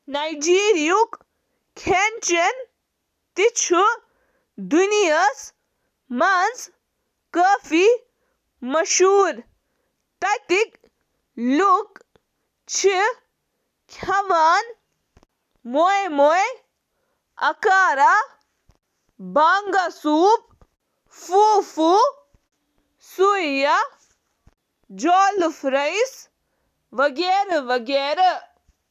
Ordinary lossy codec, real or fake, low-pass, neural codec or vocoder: none; real; 14.4 kHz; none